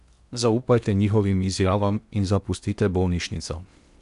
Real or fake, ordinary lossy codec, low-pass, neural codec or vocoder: fake; none; 10.8 kHz; codec, 16 kHz in and 24 kHz out, 0.8 kbps, FocalCodec, streaming, 65536 codes